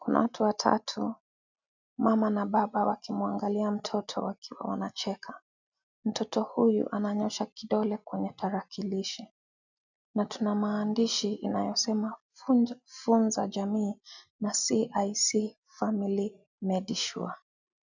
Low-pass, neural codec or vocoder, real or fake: 7.2 kHz; none; real